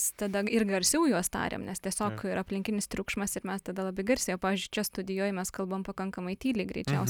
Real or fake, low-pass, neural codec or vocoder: real; 19.8 kHz; none